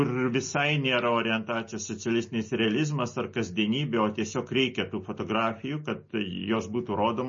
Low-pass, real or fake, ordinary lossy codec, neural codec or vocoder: 7.2 kHz; real; MP3, 32 kbps; none